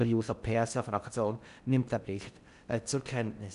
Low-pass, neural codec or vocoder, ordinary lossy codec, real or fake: 10.8 kHz; codec, 16 kHz in and 24 kHz out, 0.6 kbps, FocalCodec, streaming, 4096 codes; none; fake